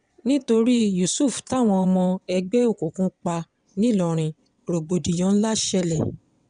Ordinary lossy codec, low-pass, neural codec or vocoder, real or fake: none; 9.9 kHz; vocoder, 22.05 kHz, 80 mel bands, WaveNeXt; fake